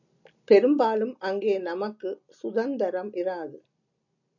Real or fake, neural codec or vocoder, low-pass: real; none; 7.2 kHz